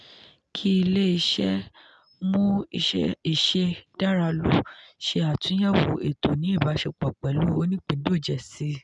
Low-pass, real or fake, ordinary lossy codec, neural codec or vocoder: 10.8 kHz; real; none; none